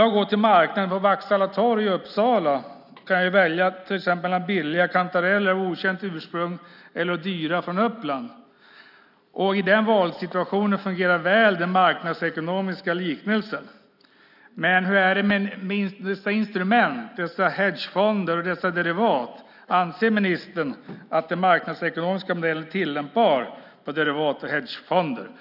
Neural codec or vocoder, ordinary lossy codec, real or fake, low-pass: none; none; real; 5.4 kHz